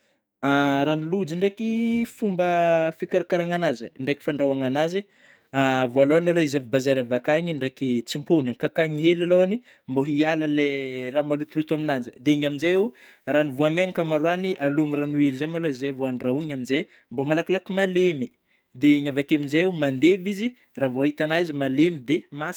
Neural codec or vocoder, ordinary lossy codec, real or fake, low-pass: codec, 44.1 kHz, 2.6 kbps, SNAC; none; fake; none